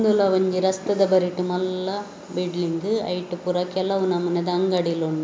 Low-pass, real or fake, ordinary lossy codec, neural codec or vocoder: none; real; none; none